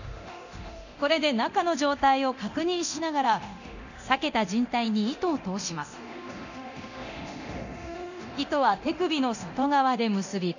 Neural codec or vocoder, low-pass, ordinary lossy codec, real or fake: codec, 24 kHz, 0.9 kbps, DualCodec; 7.2 kHz; none; fake